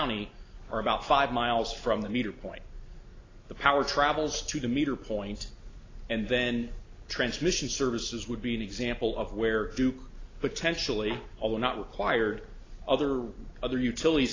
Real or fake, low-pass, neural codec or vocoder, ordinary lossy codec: real; 7.2 kHz; none; AAC, 32 kbps